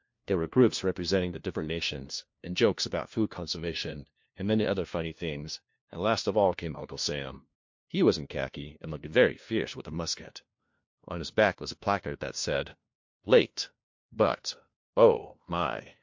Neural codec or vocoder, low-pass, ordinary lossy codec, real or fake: codec, 16 kHz, 1 kbps, FunCodec, trained on LibriTTS, 50 frames a second; 7.2 kHz; MP3, 48 kbps; fake